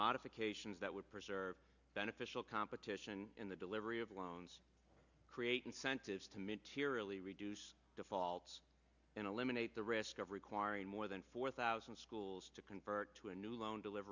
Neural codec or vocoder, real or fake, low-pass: none; real; 7.2 kHz